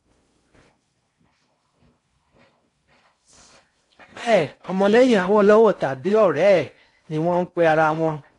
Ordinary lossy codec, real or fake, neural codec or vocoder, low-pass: AAC, 48 kbps; fake; codec, 16 kHz in and 24 kHz out, 0.6 kbps, FocalCodec, streaming, 4096 codes; 10.8 kHz